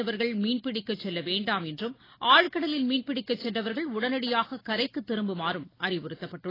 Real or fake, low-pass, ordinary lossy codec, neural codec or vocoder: real; 5.4 kHz; AAC, 24 kbps; none